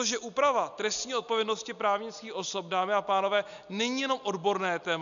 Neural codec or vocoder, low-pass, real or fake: none; 7.2 kHz; real